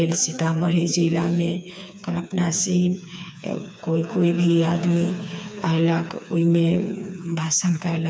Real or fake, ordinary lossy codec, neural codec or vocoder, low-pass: fake; none; codec, 16 kHz, 4 kbps, FreqCodec, smaller model; none